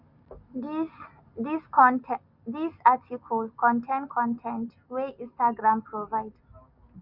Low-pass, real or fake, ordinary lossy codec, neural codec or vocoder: 5.4 kHz; real; none; none